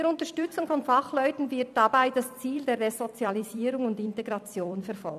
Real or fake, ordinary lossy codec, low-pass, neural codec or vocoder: fake; none; 14.4 kHz; vocoder, 44.1 kHz, 128 mel bands every 256 samples, BigVGAN v2